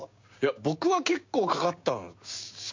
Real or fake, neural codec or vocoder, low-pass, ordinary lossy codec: fake; codec, 16 kHz, 6 kbps, DAC; 7.2 kHz; MP3, 48 kbps